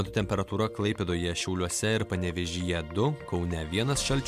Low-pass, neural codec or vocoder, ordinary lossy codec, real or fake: 14.4 kHz; none; MP3, 96 kbps; real